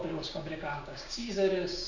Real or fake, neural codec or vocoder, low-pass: fake; codec, 16 kHz, 6 kbps, DAC; 7.2 kHz